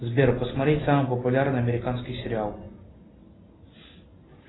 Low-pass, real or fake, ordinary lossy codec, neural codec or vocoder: 7.2 kHz; real; AAC, 16 kbps; none